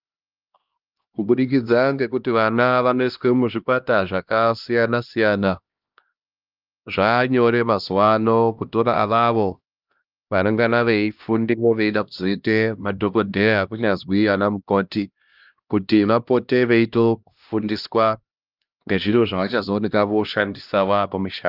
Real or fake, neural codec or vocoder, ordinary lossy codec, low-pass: fake; codec, 16 kHz, 1 kbps, X-Codec, HuBERT features, trained on LibriSpeech; Opus, 24 kbps; 5.4 kHz